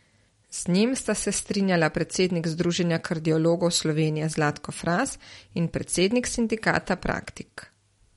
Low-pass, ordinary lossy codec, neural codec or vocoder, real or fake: 14.4 kHz; MP3, 48 kbps; none; real